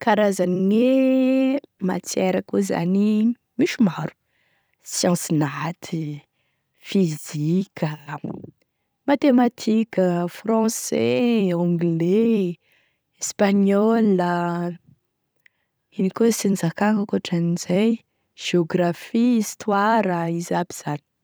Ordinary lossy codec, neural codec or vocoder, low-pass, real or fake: none; vocoder, 44.1 kHz, 128 mel bands every 256 samples, BigVGAN v2; none; fake